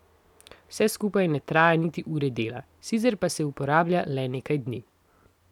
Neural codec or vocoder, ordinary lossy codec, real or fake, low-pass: none; none; real; 19.8 kHz